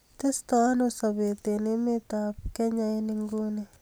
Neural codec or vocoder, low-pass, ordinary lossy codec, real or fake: none; none; none; real